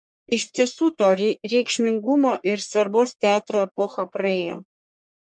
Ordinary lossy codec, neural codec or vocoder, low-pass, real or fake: MP3, 64 kbps; codec, 44.1 kHz, 1.7 kbps, Pupu-Codec; 9.9 kHz; fake